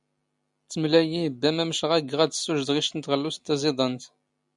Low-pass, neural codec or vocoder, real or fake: 10.8 kHz; none; real